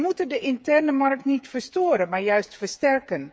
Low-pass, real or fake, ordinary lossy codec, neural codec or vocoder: none; fake; none; codec, 16 kHz, 8 kbps, FreqCodec, smaller model